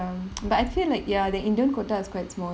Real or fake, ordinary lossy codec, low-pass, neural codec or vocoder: real; none; none; none